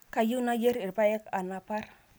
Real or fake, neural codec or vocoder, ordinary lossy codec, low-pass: real; none; none; none